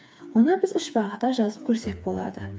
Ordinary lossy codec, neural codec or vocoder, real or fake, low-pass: none; codec, 16 kHz, 4 kbps, FreqCodec, smaller model; fake; none